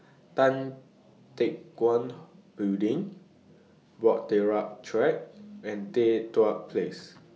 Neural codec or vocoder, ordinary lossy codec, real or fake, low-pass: none; none; real; none